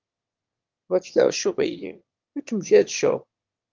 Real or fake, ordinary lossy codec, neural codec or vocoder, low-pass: fake; Opus, 32 kbps; autoencoder, 22.05 kHz, a latent of 192 numbers a frame, VITS, trained on one speaker; 7.2 kHz